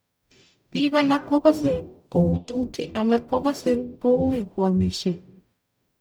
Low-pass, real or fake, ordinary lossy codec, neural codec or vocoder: none; fake; none; codec, 44.1 kHz, 0.9 kbps, DAC